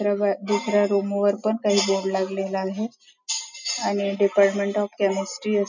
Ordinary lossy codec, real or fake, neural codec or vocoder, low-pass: none; real; none; 7.2 kHz